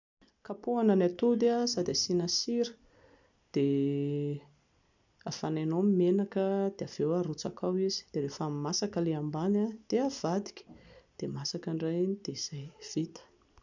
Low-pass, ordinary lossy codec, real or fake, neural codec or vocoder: 7.2 kHz; none; real; none